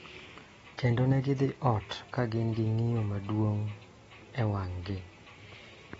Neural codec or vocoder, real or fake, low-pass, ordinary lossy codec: none; real; 19.8 kHz; AAC, 24 kbps